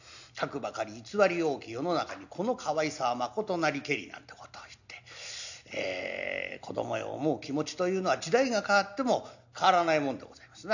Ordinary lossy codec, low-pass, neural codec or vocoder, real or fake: none; 7.2 kHz; none; real